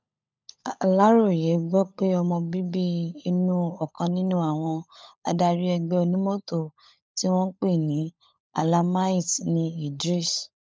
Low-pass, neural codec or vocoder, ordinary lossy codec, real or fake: none; codec, 16 kHz, 16 kbps, FunCodec, trained on LibriTTS, 50 frames a second; none; fake